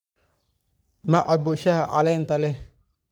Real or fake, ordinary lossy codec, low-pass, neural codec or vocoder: fake; none; none; codec, 44.1 kHz, 3.4 kbps, Pupu-Codec